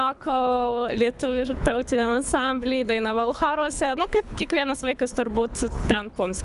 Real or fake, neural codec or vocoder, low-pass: fake; codec, 24 kHz, 3 kbps, HILCodec; 10.8 kHz